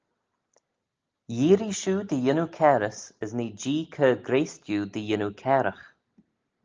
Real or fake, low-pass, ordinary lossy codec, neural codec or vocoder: real; 7.2 kHz; Opus, 24 kbps; none